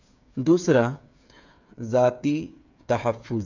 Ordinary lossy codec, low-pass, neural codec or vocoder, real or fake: none; 7.2 kHz; codec, 16 kHz, 8 kbps, FreqCodec, smaller model; fake